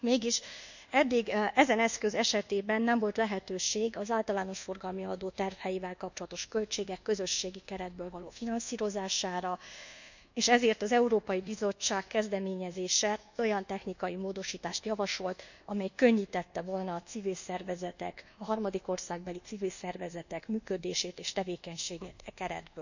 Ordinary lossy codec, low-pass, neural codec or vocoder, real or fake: none; 7.2 kHz; codec, 24 kHz, 1.2 kbps, DualCodec; fake